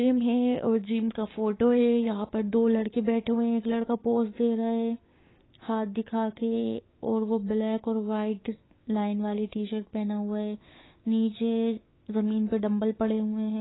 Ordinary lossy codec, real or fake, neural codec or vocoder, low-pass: AAC, 16 kbps; fake; codec, 16 kHz, 6 kbps, DAC; 7.2 kHz